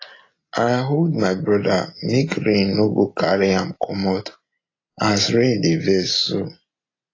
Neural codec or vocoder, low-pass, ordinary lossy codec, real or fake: none; 7.2 kHz; AAC, 32 kbps; real